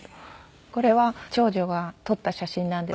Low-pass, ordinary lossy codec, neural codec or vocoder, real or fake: none; none; none; real